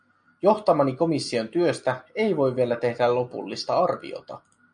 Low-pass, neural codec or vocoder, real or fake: 9.9 kHz; none; real